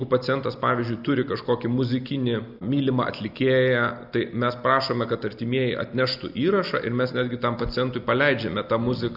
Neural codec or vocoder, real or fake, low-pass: none; real; 5.4 kHz